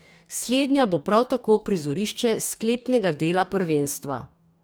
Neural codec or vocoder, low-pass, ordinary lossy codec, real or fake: codec, 44.1 kHz, 2.6 kbps, DAC; none; none; fake